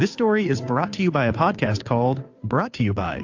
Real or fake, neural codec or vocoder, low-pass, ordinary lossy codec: fake; codec, 16 kHz, 2 kbps, FunCodec, trained on Chinese and English, 25 frames a second; 7.2 kHz; AAC, 48 kbps